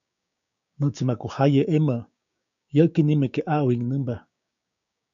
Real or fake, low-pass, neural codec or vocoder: fake; 7.2 kHz; codec, 16 kHz, 6 kbps, DAC